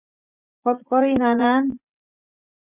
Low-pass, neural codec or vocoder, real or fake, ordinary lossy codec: 3.6 kHz; vocoder, 44.1 kHz, 80 mel bands, Vocos; fake; Opus, 64 kbps